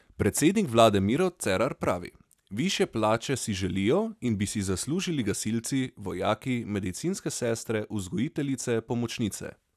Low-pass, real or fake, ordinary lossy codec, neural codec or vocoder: 14.4 kHz; real; none; none